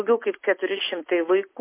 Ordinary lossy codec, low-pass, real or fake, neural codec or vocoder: MP3, 24 kbps; 3.6 kHz; real; none